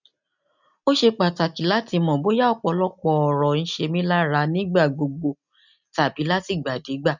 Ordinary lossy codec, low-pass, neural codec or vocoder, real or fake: none; 7.2 kHz; none; real